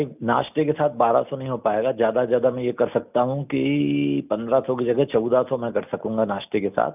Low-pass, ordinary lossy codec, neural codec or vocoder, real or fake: 3.6 kHz; none; none; real